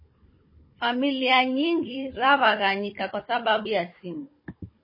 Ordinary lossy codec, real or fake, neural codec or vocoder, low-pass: MP3, 24 kbps; fake; codec, 16 kHz, 16 kbps, FunCodec, trained on Chinese and English, 50 frames a second; 5.4 kHz